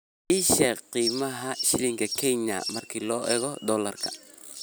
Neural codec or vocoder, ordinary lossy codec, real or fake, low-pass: none; none; real; none